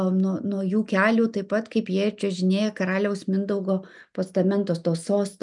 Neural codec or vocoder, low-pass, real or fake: none; 10.8 kHz; real